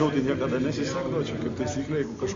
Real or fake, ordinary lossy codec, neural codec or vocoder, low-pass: real; MP3, 48 kbps; none; 7.2 kHz